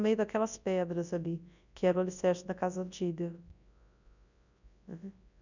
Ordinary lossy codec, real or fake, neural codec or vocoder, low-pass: none; fake; codec, 24 kHz, 0.9 kbps, WavTokenizer, large speech release; 7.2 kHz